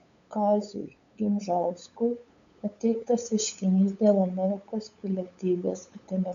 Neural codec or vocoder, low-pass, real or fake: codec, 16 kHz, 8 kbps, FunCodec, trained on LibriTTS, 25 frames a second; 7.2 kHz; fake